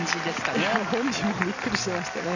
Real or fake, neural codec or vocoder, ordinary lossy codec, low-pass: real; none; none; 7.2 kHz